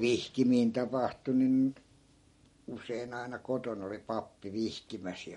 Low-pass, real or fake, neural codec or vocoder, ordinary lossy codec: 19.8 kHz; real; none; MP3, 48 kbps